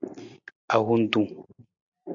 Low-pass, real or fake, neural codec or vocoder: 7.2 kHz; real; none